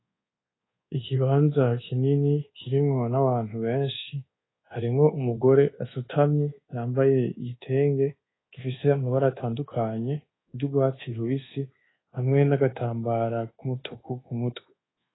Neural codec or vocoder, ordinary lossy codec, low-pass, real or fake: codec, 24 kHz, 1.2 kbps, DualCodec; AAC, 16 kbps; 7.2 kHz; fake